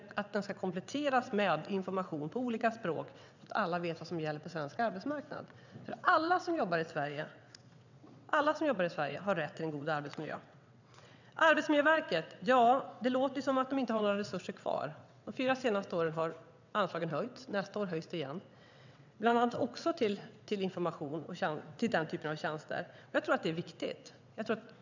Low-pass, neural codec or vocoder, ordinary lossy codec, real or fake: 7.2 kHz; vocoder, 22.05 kHz, 80 mel bands, WaveNeXt; none; fake